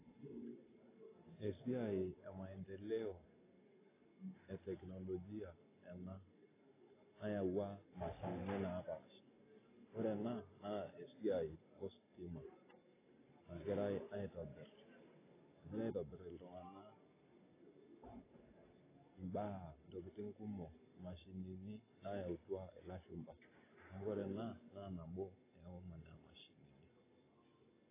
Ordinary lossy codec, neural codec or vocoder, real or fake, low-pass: AAC, 16 kbps; none; real; 3.6 kHz